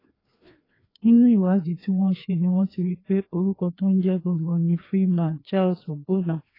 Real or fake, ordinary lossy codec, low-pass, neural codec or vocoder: fake; AAC, 24 kbps; 5.4 kHz; codec, 16 kHz, 2 kbps, FreqCodec, larger model